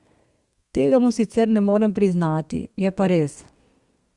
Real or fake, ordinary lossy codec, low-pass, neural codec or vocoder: fake; Opus, 64 kbps; 10.8 kHz; codec, 32 kHz, 1.9 kbps, SNAC